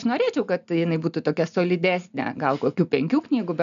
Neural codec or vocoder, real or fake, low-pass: none; real; 7.2 kHz